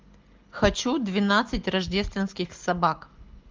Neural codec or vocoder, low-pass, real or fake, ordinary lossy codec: none; 7.2 kHz; real; Opus, 24 kbps